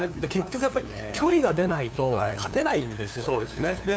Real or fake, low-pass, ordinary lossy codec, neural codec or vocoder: fake; none; none; codec, 16 kHz, 2 kbps, FunCodec, trained on LibriTTS, 25 frames a second